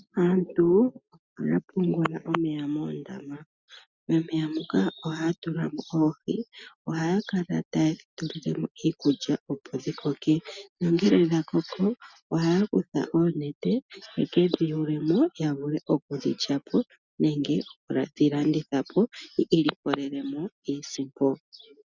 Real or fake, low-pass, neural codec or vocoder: real; 7.2 kHz; none